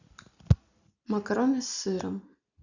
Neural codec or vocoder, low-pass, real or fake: none; 7.2 kHz; real